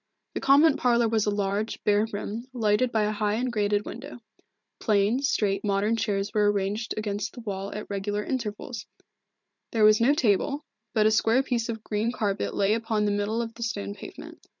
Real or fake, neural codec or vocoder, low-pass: fake; vocoder, 44.1 kHz, 128 mel bands every 512 samples, BigVGAN v2; 7.2 kHz